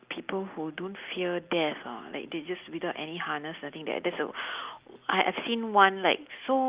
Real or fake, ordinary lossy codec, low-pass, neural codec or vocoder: real; Opus, 32 kbps; 3.6 kHz; none